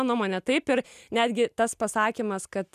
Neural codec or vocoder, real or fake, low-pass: none; real; 14.4 kHz